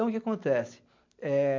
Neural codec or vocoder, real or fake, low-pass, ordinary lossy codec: none; real; 7.2 kHz; MP3, 48 kbps